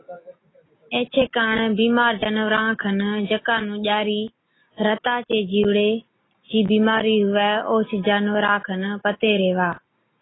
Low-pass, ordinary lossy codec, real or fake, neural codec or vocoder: 7.2 kHz; AAC, 16 kbps; real; none